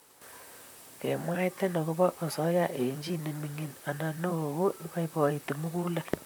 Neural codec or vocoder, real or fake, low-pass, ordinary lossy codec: vocoder, 44.1 kHz, 128 mel bands, Pupu-Vocoder; fake; none; none